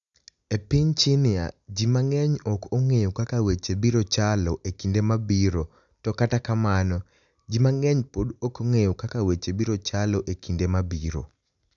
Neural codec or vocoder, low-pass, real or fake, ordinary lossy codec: none; 7.2 kHz; real; none